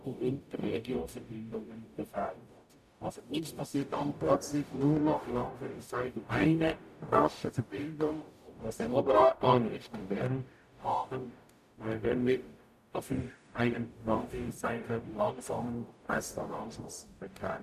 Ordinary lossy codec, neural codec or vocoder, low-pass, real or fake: none; codec, 44.1 kHz, 0.9 kbps, DAC; 14.4 kHz; fake